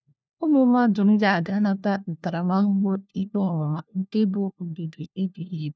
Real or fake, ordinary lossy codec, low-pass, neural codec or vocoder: fake; none; none; codec, 16 kHz, 1 kbps, FunCodec, trained on LibriTTS, 50 frames a second